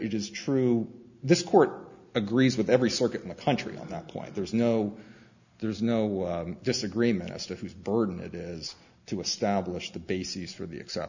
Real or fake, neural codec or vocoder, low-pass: real; none; 7.2 kHz